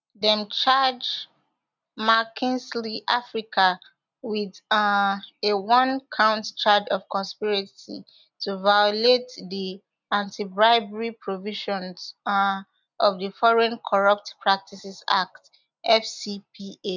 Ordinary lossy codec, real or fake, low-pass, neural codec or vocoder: none; real; 7.2 kHz; none